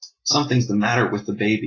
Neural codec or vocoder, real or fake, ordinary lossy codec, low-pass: none; real; AAC, 32 kbps; 7.2 kHz